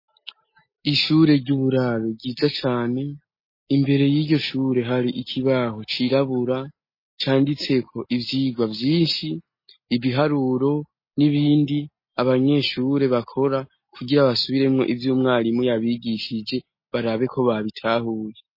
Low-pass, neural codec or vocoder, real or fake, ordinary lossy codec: 5.4 kHz; none; real; MP3, 24 kbps